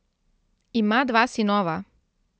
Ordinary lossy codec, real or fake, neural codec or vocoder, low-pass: none; real; none; none